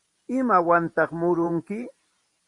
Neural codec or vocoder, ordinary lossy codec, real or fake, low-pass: vocoder, 44.1 kHz, 128 mel bands every 512 samples, BigVGAN v2; Opus, 64 kbps; fake; 10.8 kHz